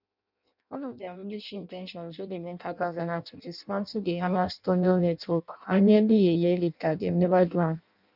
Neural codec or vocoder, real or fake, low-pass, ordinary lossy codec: codec, 16 kHz in and 24 kHz out, 0.6 kbps, FireRedTTS-2 codec; fake; 5.4 kHz; none